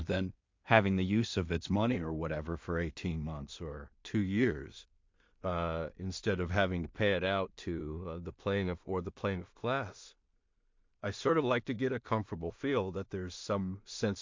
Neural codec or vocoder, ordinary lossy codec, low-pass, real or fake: codec, 16 kHz in and 24 kHz out, 0.4 kbps, LongCat-Audio-Codec, two codebook decoder; MP3, 48 kbps; 7.2 kHz; fake